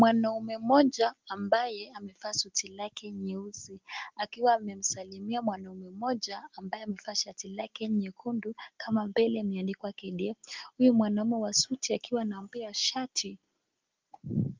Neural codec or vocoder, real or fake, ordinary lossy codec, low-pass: none; real; Opus, 32 kbps; 7.2 kHz